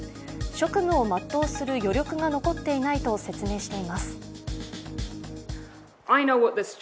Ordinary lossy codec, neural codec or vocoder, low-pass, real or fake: none; none; none; real